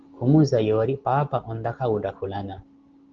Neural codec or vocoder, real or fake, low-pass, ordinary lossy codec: none; real; 7.2 kHz; Opus, 16 kbps